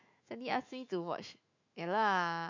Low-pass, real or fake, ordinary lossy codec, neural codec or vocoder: 7.2 kHz; fake; MP3, 48 kbps; autoencoder, 48 kHz, 128 numbers a frame, DAC-VAE, trained on Japanese speech